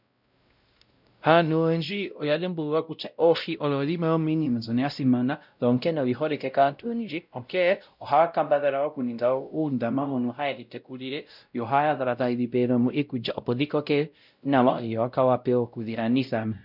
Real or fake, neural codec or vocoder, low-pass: fake; codec, 16 kHz, 0.5 kbps, X-Codec, WavLM features, trained on Multilingual LibriSpeech; 5.4 kHz